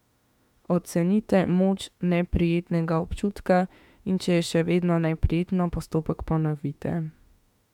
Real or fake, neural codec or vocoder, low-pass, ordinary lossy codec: fake; autoencoder, 48 kHz, 32 numbers a frame, DAC-VAE, trained on Japanese speech; 19.8 kHz; MP3, 96 kbps